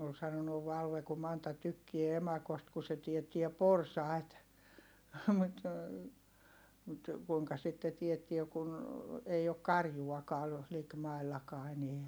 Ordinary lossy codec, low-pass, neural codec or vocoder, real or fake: none; none; none; real